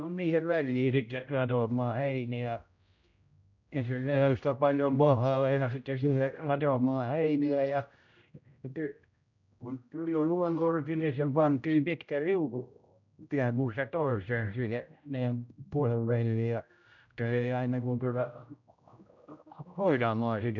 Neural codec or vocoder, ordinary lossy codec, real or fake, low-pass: codec, 16 kHz, 0.5 kbps, X-Codec, HuBERT features, trained on general audio; none; fake; 7.2 kHz